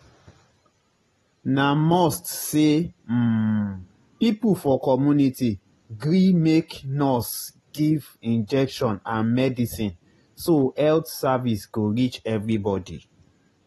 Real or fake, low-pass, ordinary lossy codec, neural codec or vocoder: real; 19.8 kHz; AAC, 32 kbps; none